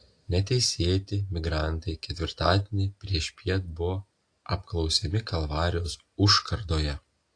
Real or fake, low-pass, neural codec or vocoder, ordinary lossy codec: real; 9.9 kHz; none; AAC, 48 kbps